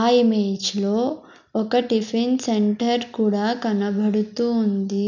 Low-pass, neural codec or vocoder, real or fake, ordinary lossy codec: 7.2 kHz; none; real; none